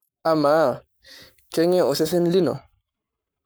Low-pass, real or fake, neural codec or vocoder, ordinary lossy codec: none; fake; codec, 44.1 kHz, 7.8 kbps, Pupu-Codec; none